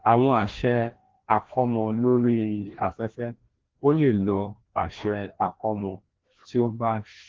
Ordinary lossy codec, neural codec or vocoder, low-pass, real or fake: Opus, 16 kbps; codec, 16 kHz, 1 kbps, FreqCodec, larger model; 7.2 kHz; fake